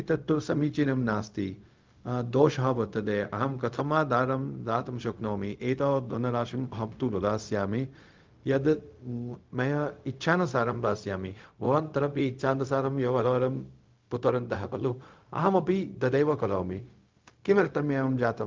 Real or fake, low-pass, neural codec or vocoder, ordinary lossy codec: fake; 7.2 kHz; codec, 16 kHz, 0.4 kbps, LongCat-Audio-Codec; Opus, 32 kbps